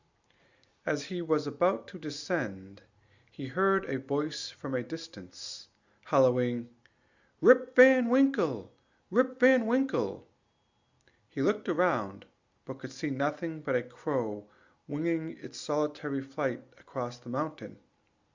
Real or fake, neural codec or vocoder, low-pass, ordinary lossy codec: real; none; 7.2 kHz; Opus, 64 kbps